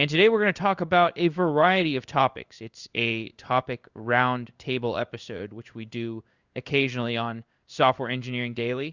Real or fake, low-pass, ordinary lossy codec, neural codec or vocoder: fake; 7.2 kHz; Opus, 64 kbps; codec, 16 kHz in and 24 kHz out, 1 kbps, XY-Tokenizer